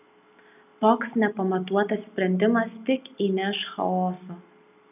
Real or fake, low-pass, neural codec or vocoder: real; 3.6 kHz; none